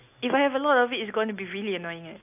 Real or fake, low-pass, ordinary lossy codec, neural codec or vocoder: real; 3.6 kHz; AAC, 32 kbps; none